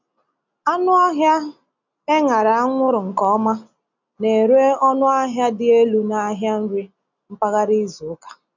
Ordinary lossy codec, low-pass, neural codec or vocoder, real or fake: none; 7.2 kHz; none; real